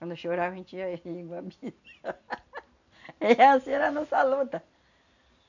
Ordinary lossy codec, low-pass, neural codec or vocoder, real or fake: none; 7.2 kHz; none; real